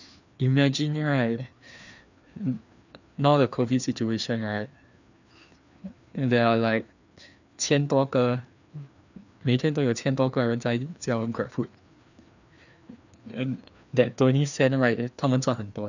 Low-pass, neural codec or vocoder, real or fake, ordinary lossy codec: 7.2 kHz; codec, 16 kHz, 2 kbps, FreqCodec, larger model; fake; none